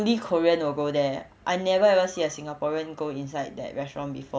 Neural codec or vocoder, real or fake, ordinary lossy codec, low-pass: none; real; none; none